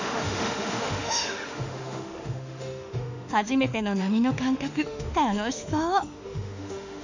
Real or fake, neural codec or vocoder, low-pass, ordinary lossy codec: fake; autoencoder, 48 kHz, 32 numbers a frame, DAC-VAE, trained on Japanese speech; 7.2 kHz; none